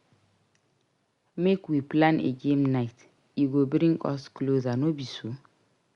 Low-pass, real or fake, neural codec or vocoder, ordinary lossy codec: 10.8 kHz; real; none; Opus, 64 kbps